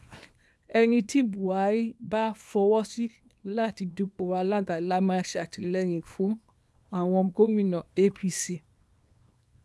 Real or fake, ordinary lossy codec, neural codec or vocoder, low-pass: fake; none; codec, 24 kHz, 0.9 kbps, WavTokenizer, small release; none